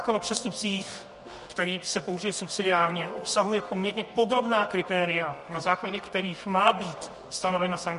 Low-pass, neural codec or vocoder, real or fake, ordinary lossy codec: 10.8 kHz; codec, 24 kHz, 0.9 kbps, WavTokenizer, medium music audio release; fake; MP3, 48 kbps